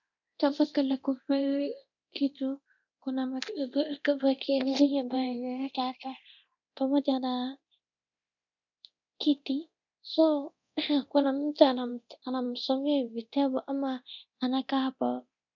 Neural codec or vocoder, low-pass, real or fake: codec, 24 kHz, 0.9 kbps, DualCodec; 7.2 kHz; fake